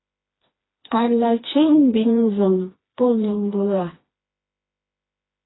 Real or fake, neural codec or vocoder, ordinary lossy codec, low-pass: fake; codec, 16 kHz, 2 kbps, FreqCodec, smaller model; AAC, 16 kbps; 7.2 kHz